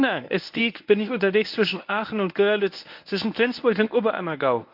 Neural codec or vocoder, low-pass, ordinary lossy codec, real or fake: codec, 24 kHz, 0.9 kbps, WavTokenizer, medium speech release version 1; 5.4 kHz; none; fake